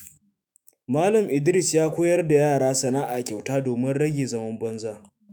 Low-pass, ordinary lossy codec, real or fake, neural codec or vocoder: none; none; fake; autoencoder, 48 kHz, 128 numbers a frame, DAC-VAE, trained on Japanese speech